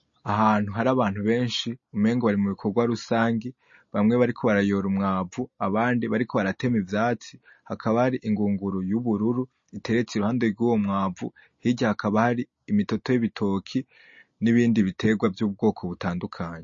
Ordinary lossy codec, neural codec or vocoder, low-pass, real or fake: MP3, 32 kbps; none; 7.2 kHz; real